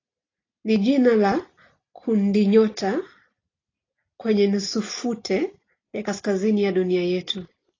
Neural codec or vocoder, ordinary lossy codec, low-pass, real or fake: none; AAC, 32 kbps; 7.2 kHz; real